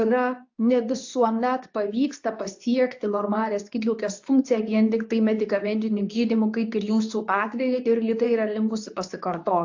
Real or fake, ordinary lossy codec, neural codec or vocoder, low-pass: fake; AAC, 48 kbps; codec, 24 kHz, 0.9 kbps, WavTokenizer, medium speech release version 2; 7.2 kHz